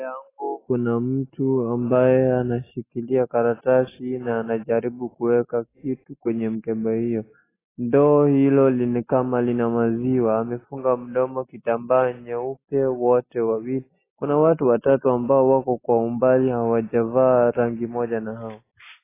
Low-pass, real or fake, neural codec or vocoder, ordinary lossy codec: 3.6 kHz; real; none; AAC, 16 kbps